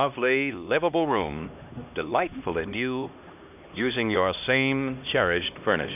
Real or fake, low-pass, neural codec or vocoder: fake; 3.6 kHz; codec, 16 kHz, 1 kbps, X-Codec, HuBERT features, trained on LibriSpeech